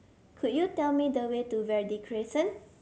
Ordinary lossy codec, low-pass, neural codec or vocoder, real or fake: none; none; none; real